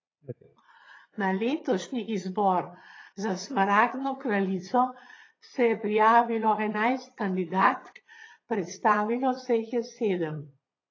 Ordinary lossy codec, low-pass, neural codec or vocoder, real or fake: AAC, 32 kbps; 7.2 kHz; vocoder, 22.05 kHz, 80 mel bands, Vocos; fake